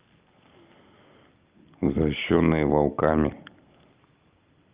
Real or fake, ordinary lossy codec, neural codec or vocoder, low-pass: real; Opus, 24 kbps; none; 3.6 kHz